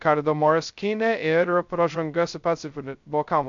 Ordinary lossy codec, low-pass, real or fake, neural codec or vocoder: MP3, 64 kbps; 7.2 kHz; fake; codec, 16 kHz, 0.2 kbps, FocalCodec